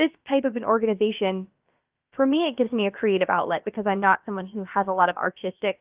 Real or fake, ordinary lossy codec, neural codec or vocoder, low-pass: fake; Opus, 24 kbps; codec, 16 kHz, about 1 kbps, DyCAST, with the encoder's durations; 3.6 kHz